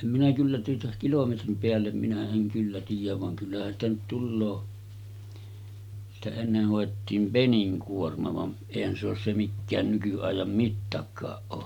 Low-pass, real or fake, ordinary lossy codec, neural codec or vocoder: 19.8 kHz; real; none; none